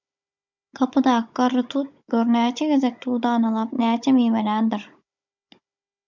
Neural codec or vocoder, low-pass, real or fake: codec, 16 kHz, 16 kbps, FunCodec, trained on Chinese and English, 50 frames a second; 7.2 kHz; fake